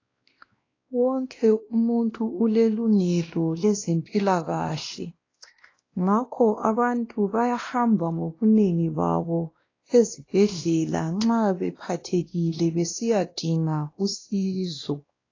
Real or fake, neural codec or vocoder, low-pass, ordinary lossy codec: fake; codec, 16 kHz, 1 kbps, X-Codec, WavLM features, trained on Multilingual LibriSpeech; 7.2 kHz; AAC, 32 kbps